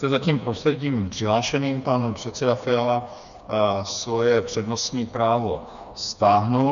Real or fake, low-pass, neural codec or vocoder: fake; 7.2 kHz; codec, 16 kHz, 2 kbps, FreqCodec, smaller model